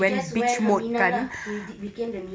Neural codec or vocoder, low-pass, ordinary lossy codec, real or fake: none; none; none; real